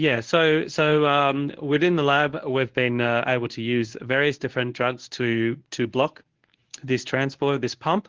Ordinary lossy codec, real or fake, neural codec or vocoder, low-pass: Opus, 16 kbps; fake; codec, 24 kHz, 0.9 kbps, WavTokenizer, medium speech release version 1; 7.2 kHz